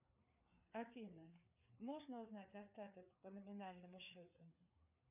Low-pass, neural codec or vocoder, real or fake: 3.6 kHz; codec, 16 kHz, 4 kbps, FreqCodec, larger model; fake